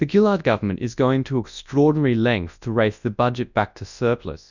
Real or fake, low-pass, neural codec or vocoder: fake; 7.2 kHz; codec, 24 kHz, 0.9 kbps, WavTokenizer, large speech release